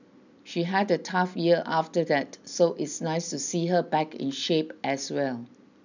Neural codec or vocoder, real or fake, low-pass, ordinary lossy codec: none; real; 7.2 kHz; none